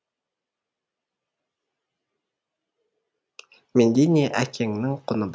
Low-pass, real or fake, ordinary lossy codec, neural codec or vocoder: none; real; none; none